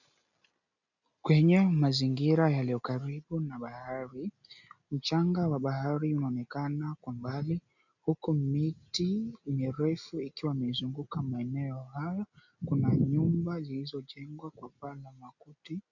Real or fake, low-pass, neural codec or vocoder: real; 7.2 kHz; none